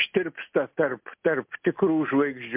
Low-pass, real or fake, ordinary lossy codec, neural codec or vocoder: 3.6 kHz; real; MP3, 32 kbps; none